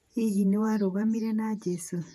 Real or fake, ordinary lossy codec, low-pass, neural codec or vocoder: fake; AAC, 96 kbps; 14.4 kHz; vocoder, 48 kHz, 128 mel bands, Vocos